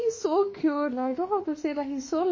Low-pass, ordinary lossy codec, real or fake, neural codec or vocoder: 7.2 kHz; MP3, 32 kbps; fake; autoencoder, 48 kHz, 32 numbers a frame, DAC-VAE, trained on Japanese speech